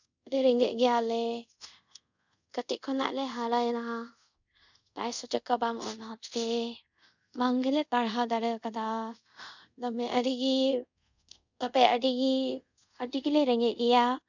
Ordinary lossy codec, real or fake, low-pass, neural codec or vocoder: none; fake; 7.2 kHz; codec, 24 kHz, 0.5 kbps, DualCodec